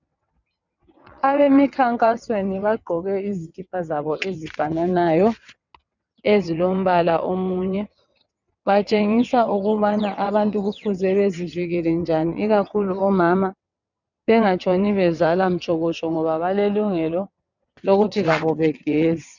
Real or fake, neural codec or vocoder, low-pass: fake; vocoder, 22.05 kHz, 80 mel bands, WaveNeXt; 7.2 kHz